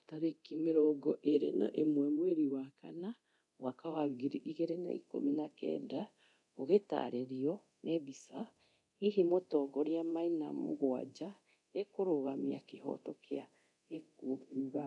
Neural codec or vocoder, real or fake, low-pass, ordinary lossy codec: codec, 24 kHz, 0.9 kbps, DualCodec; fake; 10.8 kHz; none